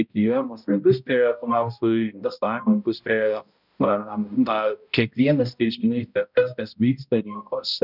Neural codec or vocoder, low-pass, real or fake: codec, 16 kHz, 0.5 kbps, X-Codec, HuBERT features, trained on balanced general audio; 5.4 kHz; fake